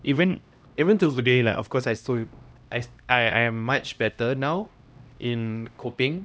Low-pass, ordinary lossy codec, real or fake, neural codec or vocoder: none; none; fake; codec, 16 kHz, 1 kbps, X-Codec, HuBERT features, trained on LibriSpeech